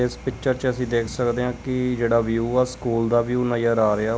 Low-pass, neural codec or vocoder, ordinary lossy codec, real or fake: none; none; none; real